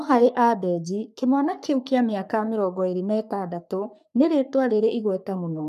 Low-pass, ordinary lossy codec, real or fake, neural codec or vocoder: 14.4 kHz; none; fake; codec, 44.1 kHz, 3.4 kbps, Pupu-Codec